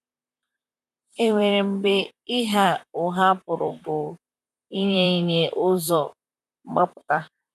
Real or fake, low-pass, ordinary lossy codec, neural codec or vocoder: fake; 14.4 kHz; none; vocoder, 44.1 kHz, 128 mel bands, Pupu-Vocoder